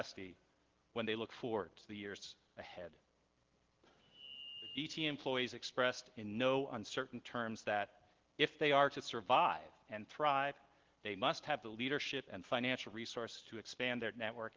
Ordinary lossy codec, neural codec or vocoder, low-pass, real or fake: Opus, 16 kbps; none; 7.2 kHz; real